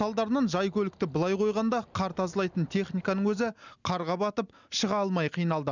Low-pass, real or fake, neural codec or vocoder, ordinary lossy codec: 7.2 kHz; real; none; none